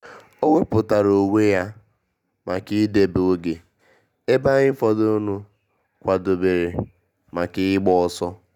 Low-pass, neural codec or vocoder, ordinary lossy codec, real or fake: 19.8 kHz; none; none; real